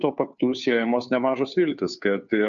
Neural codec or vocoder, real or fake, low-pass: codec, 16 kHz, 2 kbps, FunCodec, trained on Chinese and English, 25 frames a second; fake; 7.2 kHz